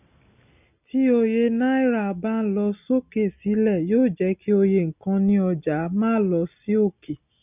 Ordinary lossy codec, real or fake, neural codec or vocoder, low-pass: none; real; none; 3.6 kHz